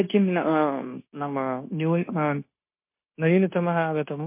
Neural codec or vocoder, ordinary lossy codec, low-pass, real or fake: codec, 16 kHz, 0.9 kbps, LongCat-Audio-Codec; MP3, 24 kbps; 3.6 kHz; fake